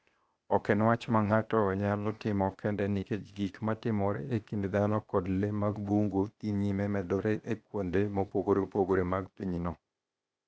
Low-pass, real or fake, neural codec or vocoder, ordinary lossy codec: none; fake; codec, 16 kHz, 0.8 kbps, ZipCodec; none